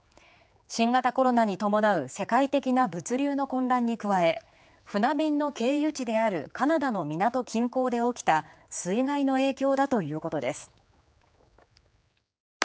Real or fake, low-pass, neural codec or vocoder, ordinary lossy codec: fake; none; codec, 16 kHz, 4 kbps, X-Codec, HuBERT features, trained on general audio; none